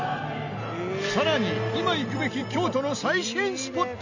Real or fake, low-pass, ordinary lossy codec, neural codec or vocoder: real; 7.2 kHz; none; none